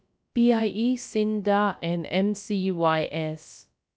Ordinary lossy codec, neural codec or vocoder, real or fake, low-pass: none; codec, 16 kHz, about 1 kbps, DyCAST, with the encoder's durations; fake; none